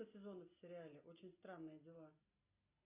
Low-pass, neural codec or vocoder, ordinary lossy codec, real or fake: 3.6 kHz; none; AAC, 24 kbps; real